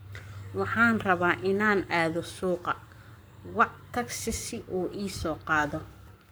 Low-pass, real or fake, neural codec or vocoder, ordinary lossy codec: none; fake; codec, 44.1 kHz, 7.8 kbps, Pupu-Codec; none